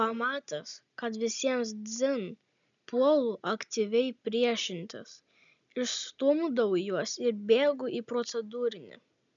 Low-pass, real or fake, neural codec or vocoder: 7.2 kHz; real; none